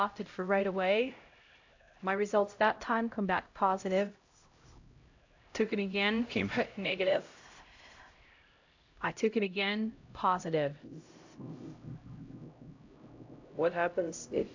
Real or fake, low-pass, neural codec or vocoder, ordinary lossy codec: fake; 7.2 kHz; codec, 16 kHz, 0.5 kbps, X-Codec, HuBERT features, trained on LibriSpeech; AAC, 48 kbps